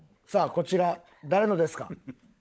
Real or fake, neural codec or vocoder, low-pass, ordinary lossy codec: fake; codec, 16 kHz, 16 kbps, FunCodec, trained on LibriTTS, 50 frames a second; none; none